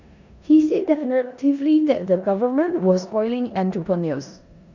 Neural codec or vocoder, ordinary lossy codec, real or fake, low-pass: codec, 16 kHz in and 24 kHz out, 0.9 kbps, LongCat-Audio-Codec, four codebook decoder; none; fake; 7.2 kHz